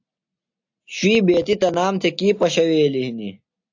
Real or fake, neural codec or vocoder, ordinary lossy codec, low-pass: real; none; AAC, 48 kbps; 7.2 kHz